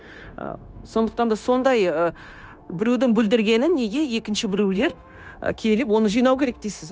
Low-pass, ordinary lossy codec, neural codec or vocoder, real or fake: none; none; codec, 16 kHz, 0.9 kbps, LongCat-Audio-Codec; fake